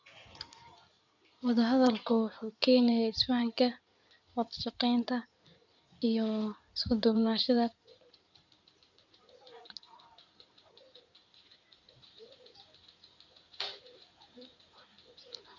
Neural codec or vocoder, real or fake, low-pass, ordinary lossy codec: codec, 16 kHz in and 24 kHz out, 2.2 kbps, FireRedTTS-2 codec; fake; 7.2 kHz; none